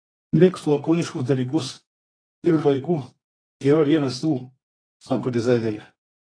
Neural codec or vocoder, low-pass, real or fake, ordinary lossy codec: codec, 24 kHz, 0.9 kbps, WavTokenizer, medium music audio release; 9.9 kHz; fake; AAC, 32 kbps